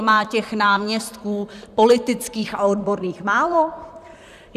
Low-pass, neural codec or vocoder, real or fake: 14.4 kHz; vocoder, 44.1 kHz, 128 mel bands, Pupu-Vocoder; fake